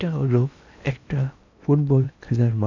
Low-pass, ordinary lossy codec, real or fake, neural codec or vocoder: 7.2 kHz; none; fake; codec, 16 kHz in and 24 kHz out, 0.8 kbps, FocalCodec, streaming, 65536 codes